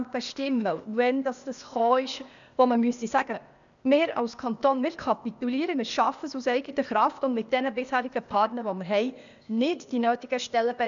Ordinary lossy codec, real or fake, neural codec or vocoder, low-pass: none; fake; codec, 16 kHz, 0.8 kbps, ZipCodec; 7.2 kHz